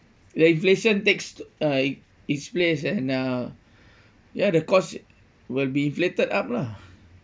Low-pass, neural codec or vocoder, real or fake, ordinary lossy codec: none; none; real; none